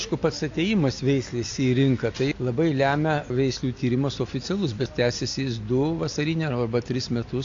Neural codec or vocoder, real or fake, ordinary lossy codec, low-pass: none; real; AAC, 48 kbps; 7.2 kHz